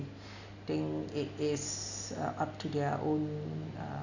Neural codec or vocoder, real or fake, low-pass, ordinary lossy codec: none; real; 7.2 kHz; none